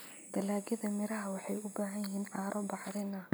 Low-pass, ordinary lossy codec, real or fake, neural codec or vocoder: none; none; real; none